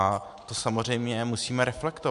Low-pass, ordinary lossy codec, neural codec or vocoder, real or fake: 10.8 kHz; MP3, 64 kbps; none; real